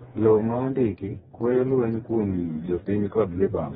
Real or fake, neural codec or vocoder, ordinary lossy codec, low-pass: fake; codec, 16 kHz, 2 kbps, FreqCodec, smaller model; AAC, 16 kbps; 7.2 kHz